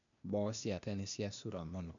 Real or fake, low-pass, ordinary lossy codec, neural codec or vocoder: fake; 7.2 kHz; none; codec, 16 kHz, 0.8 kbps, ZipCodec